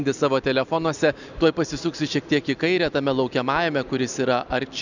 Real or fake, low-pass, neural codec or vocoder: real; 7.2 kHz; none